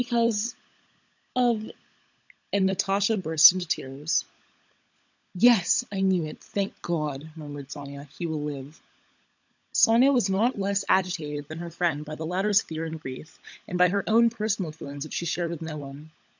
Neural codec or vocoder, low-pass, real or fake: codec, 16 kHz, 16 kbps, FunCodec, trained on LibriTTS, 50 frames a second; 7.2 kHz; fake